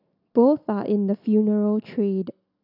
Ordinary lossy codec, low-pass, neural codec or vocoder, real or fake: none; 5.4 kHz; none; real